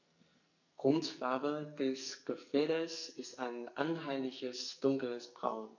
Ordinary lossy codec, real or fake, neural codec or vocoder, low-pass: none; fake; codec, 44.1 kHz, 2.6 kbps, SNAC; 7.2 kHz